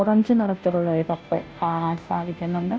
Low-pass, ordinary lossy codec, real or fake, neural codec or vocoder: none; none; fake; codec, 16 kHz, 0.5 kbps, FunCodec, trained on Chinese and English, 25 frames a second